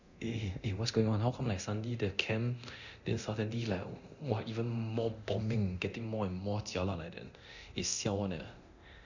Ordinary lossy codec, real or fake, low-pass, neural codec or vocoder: none; fake; 7.2 kHz; codec, 24 kHz, 0.9 kbps, DualCodec